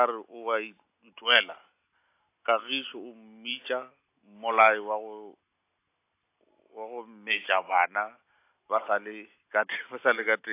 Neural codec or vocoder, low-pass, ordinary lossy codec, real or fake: none; 3.6 kHz; AAC, 24 kbps; real